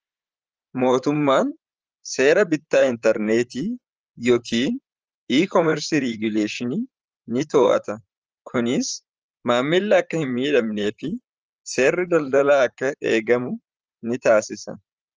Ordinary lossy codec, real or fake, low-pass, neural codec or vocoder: Opus, 24 kbps; fake; 7.2 kHz; vocoder, 44.1 kHz, 128 mel bands, Pupu-Vocoder